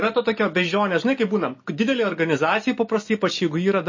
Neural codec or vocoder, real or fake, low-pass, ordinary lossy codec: none; real; 7.2 kHz; MP3, 32 kbps